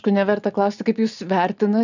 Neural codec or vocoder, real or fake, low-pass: none; real; 7.2 kHz